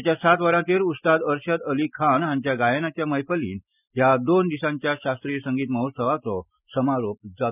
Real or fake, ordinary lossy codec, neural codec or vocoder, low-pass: real; none; none; 3.6 kHz